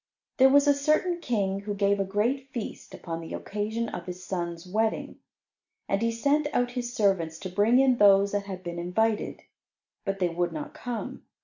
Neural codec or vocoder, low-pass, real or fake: none; 7.2 kHz; real